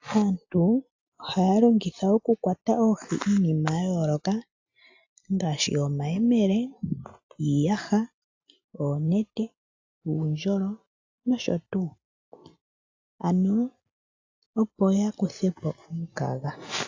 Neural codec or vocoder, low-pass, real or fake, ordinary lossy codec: none; 7.2 kHz; real; AAC, 48 kbps